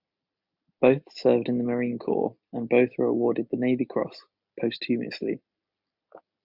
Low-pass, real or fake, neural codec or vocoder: 5.4 kHz; real; none